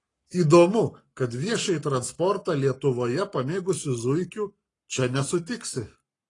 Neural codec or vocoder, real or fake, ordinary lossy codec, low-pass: codec, 44.1 kHz, 7.8 kbps, Pupu-Codec; fake; AAC, 32 kbps; 10.8 kHz